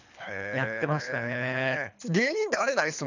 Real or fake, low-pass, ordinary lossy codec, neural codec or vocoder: fake; 7.2 kHz; none; codec, 24 kHz, 3 kbps, HILCodec